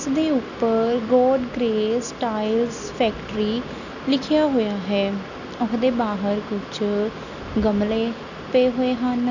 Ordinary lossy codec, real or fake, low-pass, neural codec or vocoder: none; real; 7.2 kHz; none